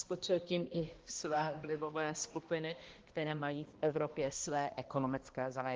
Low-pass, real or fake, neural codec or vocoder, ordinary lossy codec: 7.2 kHz; fake; codec, 16 kHz, 1 kbps, X-Codec, HuBERT features, trained on balanced general audio; Opus, 16 kbps